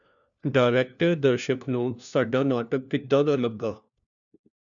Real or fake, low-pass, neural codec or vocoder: fake; 7.2 kHz; codec, 16 kHz, 1 kbps, FunCodec, trained on LibriTTS, 50 frames a second